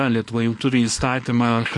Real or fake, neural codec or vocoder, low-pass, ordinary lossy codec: fake; codec, 24 kHz, 0.9 kbps, WavTokenizer, small release; 10.8 kHz; MP3, 48 kbps